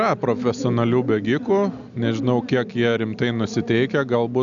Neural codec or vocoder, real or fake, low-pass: none; real; 7.2 kHz